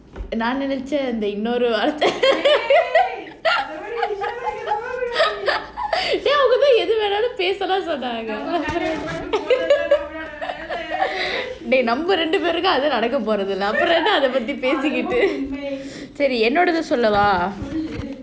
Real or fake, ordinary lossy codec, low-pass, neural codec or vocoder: real; none; none; none